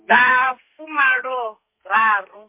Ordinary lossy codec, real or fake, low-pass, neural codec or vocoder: MP3, 32 kbps; fake; 3.6 kHz; codec, 32 kHz, 1.9 kbps, SNAC